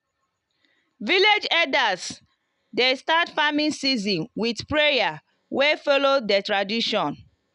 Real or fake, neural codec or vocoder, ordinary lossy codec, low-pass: real; none; none; 10.8 kHz